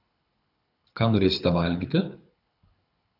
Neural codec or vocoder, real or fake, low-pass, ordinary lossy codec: codec, 24 kHz, 6 kbps, HILCodec; fake; 5.4 kHz; AAC, 48 kbps